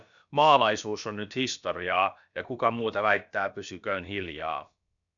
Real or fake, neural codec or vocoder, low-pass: fake; codec, 16 kHz, about 1 kbps, DyCAST, with the encoder's durations; 7.2 kHz